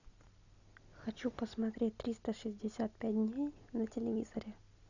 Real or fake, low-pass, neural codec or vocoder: real; 7.2 kHz; none